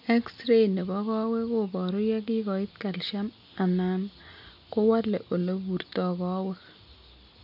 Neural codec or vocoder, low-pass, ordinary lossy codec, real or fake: none; 5.4 kHz; none; real